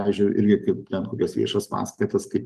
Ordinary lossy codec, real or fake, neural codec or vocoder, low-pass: Opus, 24 kbps; real; none; 14.4 kHz